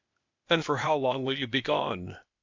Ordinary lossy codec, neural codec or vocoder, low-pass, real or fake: MP3, 64 kbps; codec, 16 kHz, 0.8 kbps, ZipCodec; 7.2 kHz; fake